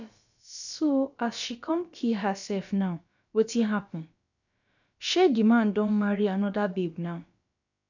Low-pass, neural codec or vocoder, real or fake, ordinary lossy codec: 7.2 kHz; codec, 16 kHz, about 1 kbps, DyCAST, with the encoder's durations; fake; none